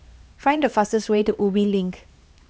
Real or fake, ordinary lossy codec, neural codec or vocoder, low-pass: fake; none; codec, 16 kHz, 2 kbps, X-Codec, HuBERT features, trained on LibriSpeech; none